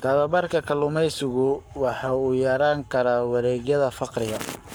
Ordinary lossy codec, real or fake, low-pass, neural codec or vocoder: none; fake; none; codec, 44.1 kHz, 7.8 kbps, Pupu-Codec